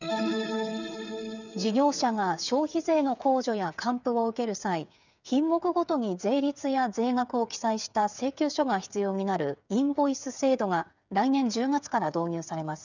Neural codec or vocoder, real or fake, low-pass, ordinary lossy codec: codec, 16 kHz, 8 kbps, FreqCodec, smaller model; fake; 7.2 kHz; none